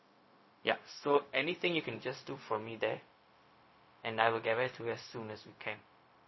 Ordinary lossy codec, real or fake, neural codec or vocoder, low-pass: MP3, 24 kbps; fake; codec, 16 kHz, 0.4 kbps, LongCat-Audio-Codec; 7.2 kHz